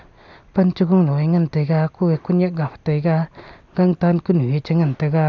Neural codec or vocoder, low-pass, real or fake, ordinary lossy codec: none; 7.2 kHz; real; none